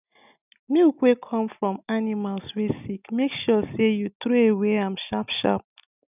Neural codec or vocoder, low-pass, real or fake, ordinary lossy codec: none; 3.6 kHz; real; none